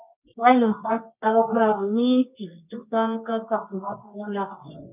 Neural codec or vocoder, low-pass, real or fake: codec, 24 kHz, 0.9 kbps, WavTokenizer, medium music audio release; 3.6 kHz; fake